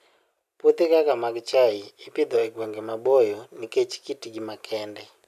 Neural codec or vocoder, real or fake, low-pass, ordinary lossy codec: none; real; 14.4 kHz; none